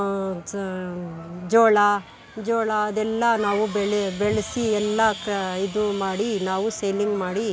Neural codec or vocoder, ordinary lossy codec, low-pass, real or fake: none; none; none; real